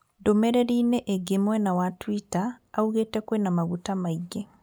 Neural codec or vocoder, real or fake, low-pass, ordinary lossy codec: none; real; none; none